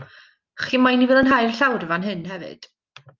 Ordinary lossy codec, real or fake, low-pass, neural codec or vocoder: Opus, 24 kbps; real; 7.2 kHz; none